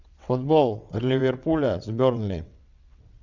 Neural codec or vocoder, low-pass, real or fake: vocoder, 22.05 kHz, 80 mel bands, WaveNeXt; 7.2 kHz; fake